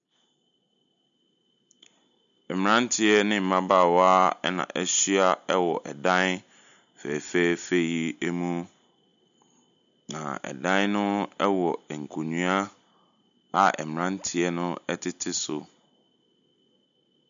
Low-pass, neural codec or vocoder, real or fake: 7.2 kHz; none; real